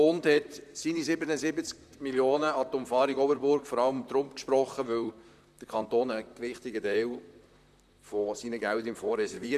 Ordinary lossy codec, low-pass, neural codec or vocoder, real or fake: none; 14.4 kHz; vocoder, 44.1 kHz, 128 mel bands, Pupu-Vocoder; fake